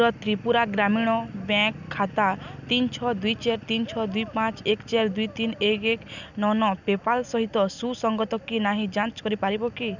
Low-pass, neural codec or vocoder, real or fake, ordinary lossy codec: 7.2 kHz; none; real; none